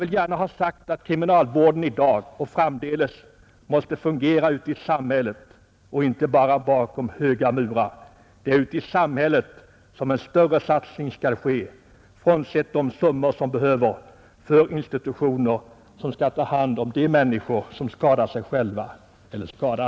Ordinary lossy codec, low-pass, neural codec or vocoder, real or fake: none; none; none; real